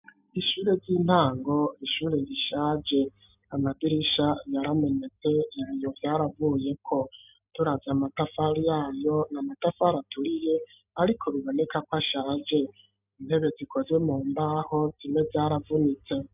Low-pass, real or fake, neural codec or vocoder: 3.6 kHz; real; none